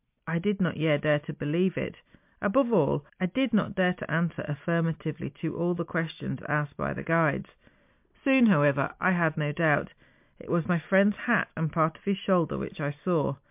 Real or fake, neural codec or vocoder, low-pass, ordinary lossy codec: real; none; 3.6 kHz; MP3, 32 kbps